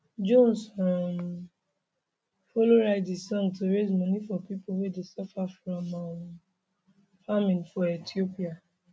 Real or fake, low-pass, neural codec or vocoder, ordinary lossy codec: real; none; none; none